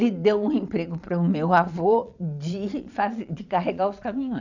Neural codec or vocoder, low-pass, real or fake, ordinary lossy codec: vocoder, 22.05 kHz, 80 mel bands, Vocos; 7.2 kHz; fake; none